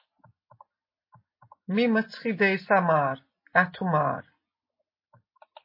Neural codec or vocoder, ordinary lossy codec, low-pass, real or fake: none; MP3, 24 kbps; 5.4 kHz; real